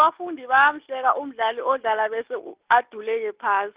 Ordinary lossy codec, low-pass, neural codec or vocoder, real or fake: Opus, 32 kbps; 3.6 kHz; none; real